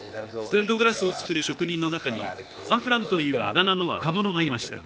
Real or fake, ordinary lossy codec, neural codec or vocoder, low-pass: fake; none; codec, 16 kHz, 0.8 kbps, ZipCodec; none